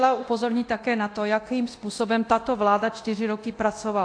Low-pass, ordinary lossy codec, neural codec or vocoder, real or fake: 9.9 kHz; AAC, 48 kbps; codec, 24 kHz, 0.9 kbps, DualCodec; fake